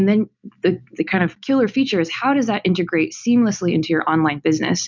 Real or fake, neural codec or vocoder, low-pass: real; none; 7.2 kHz